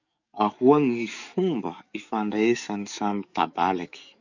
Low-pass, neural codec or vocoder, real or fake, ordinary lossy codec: 7.2 kHz; codec, 44.1 kHz, 7.8 kbps, DAC; fake; none